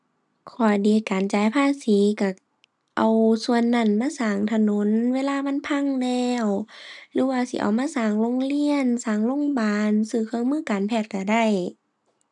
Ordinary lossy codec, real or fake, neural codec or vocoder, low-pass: none; real; none; 10.8 kHz